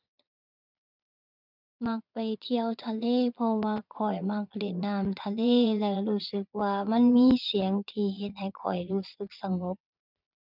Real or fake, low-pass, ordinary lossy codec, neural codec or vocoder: fake; 5.4 kHz; none; vocoder, 22.05 kHz, 80 mel bands, Vocos